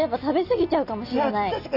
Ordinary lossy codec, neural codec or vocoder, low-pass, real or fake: none; none; 5.4 kHz; real